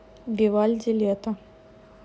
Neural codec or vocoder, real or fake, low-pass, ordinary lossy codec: none; real; none; none